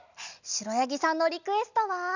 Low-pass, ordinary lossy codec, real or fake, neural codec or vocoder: 7.2 kHz; none; real; none